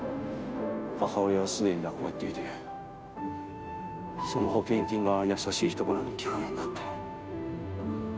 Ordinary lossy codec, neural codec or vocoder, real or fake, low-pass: none; codec, 16 kHz, 0.5 kbps, FunCodec, trained on Chinese and English, 25 frames a second; fake; none